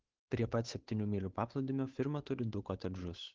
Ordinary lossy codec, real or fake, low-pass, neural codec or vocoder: Opus, 16 kbps; fake; 7.2 kHz; codec, 16 kHz, 8 kbps, FunCodec, trained on Chinese and English, 25 frames a second